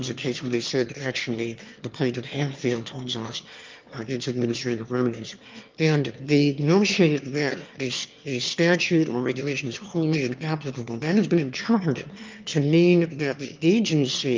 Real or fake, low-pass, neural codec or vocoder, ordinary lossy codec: fake; 7.2 kHz; autoencoder, 22.05 kHz, a latent of 192 numbers a frame, VITS, trained on one speaker; Opus, 24 kbps